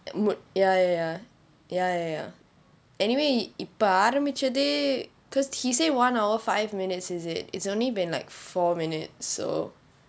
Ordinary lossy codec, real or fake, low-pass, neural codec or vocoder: none; real; none; none